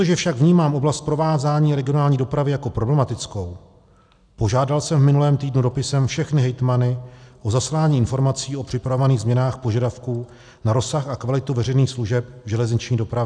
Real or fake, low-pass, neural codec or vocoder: real; 9.9 kHz; none